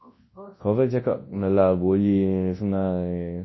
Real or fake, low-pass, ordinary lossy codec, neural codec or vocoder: fake; 7.2 kHz; MP3, 24 kbps; codec, 24 kHz, 0.9 kbps, WavTokenizer, large speech release